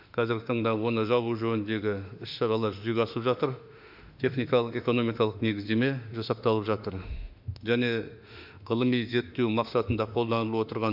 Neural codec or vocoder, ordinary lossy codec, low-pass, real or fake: autoencoder, 48 kHz, 32 numbers a frame, DAC-VAE, trained on Japanese speech; none; 5.4 kHz; fake